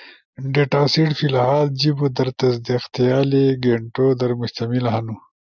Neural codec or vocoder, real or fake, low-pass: none; real; 7.2 kHz